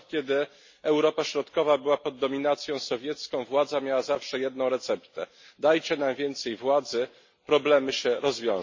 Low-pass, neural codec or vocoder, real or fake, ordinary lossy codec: 7.2 kHz; none; real; MP3, 32 kbps